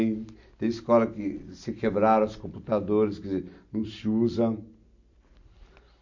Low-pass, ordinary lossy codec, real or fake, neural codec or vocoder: 7.2 kHz; none; real; none